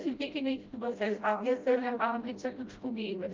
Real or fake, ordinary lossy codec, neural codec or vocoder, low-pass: fake; Opus, 32 kbps; codec, 16 kHz, 0.5 kbps, FreqCodec, smaller model; 7.2 kHz